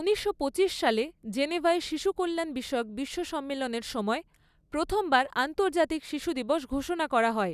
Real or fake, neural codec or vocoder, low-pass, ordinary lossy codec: real; none; 14.4 kHz; none